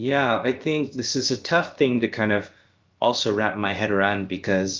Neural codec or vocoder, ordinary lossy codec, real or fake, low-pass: codec, 16 kHz, about 1 kbps, DyCAST, with the encoder's durations; Opus, 32 kbps; fake; 7.2 kHz